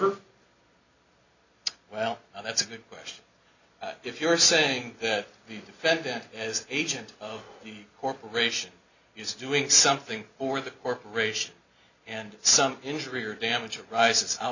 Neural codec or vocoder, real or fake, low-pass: none; real; 7.2 kHz